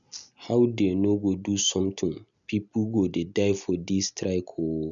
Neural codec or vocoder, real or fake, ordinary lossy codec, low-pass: none; real; MP3, 96 kbps; 7.2 kHz